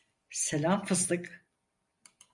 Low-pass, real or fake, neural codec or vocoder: 10.8 kHz; real; none